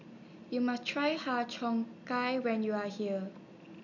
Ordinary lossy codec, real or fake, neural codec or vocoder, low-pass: none; real; none; 7.2 kHz